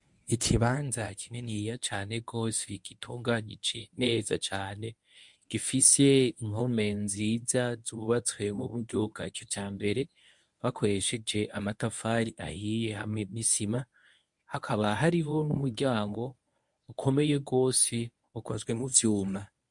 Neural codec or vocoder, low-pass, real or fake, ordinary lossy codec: codec, 24 kHz, 0.9 kbps, WavTokenizer, medium speech release version 1; 10.8 kHz; fake; MP3, 64 kbps